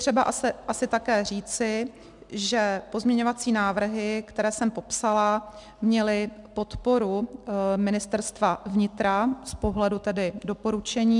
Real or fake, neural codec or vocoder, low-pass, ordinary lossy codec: real; none; 10.8 kHz; MP3, 96 kbps